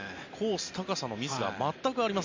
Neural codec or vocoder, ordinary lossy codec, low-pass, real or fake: none; MP3, 64 kbps; 7.2 kHz; real